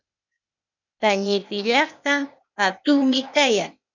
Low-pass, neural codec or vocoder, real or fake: 7.2 kHz; codec, 16 kHz, 0.8 kbps, ZipCodec; fake